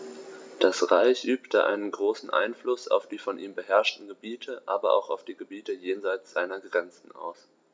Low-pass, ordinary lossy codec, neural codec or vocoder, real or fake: none; none; none; real